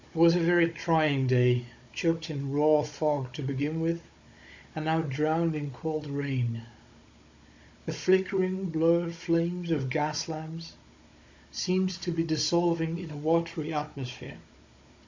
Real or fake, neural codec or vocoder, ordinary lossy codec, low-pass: fake; codec, 16 kHz, 16 kbps, FunCodec, trained on Chinese and English, 50 frames a second; MP3, 48 kbps; 7.2 kHz